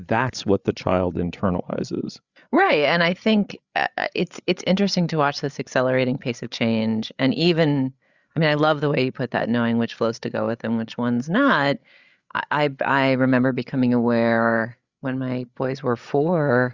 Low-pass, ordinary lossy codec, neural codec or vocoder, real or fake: 7.2 kHz; Opus, 64 kbps; codec, 16 kHz, 8 kbps, FreqCodec, larger model; fake